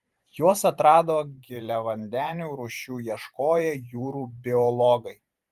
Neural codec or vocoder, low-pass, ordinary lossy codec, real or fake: vocoder, 44.1 kHz, 128 mel bands every 512 samples, BigVGAN v2; 14.4 kHz; Opus, 32 kbps; fake